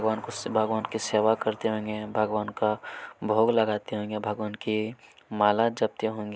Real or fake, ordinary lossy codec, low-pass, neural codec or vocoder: real; none; none; none